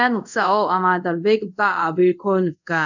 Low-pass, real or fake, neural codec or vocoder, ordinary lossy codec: 7.2 kHz; fake; codec, 24 kHz, 0.5 kbps, DualCodec; none